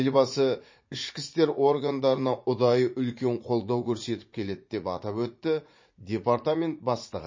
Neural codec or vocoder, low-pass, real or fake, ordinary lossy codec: vocoder, 44.1 kHz, 80 mel bands, Vocos; 7.2 kHz; fake; MP3, 32 kbps